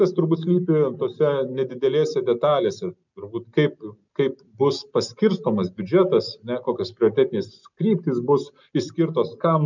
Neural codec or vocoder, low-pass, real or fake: none; 7.2 kHz; real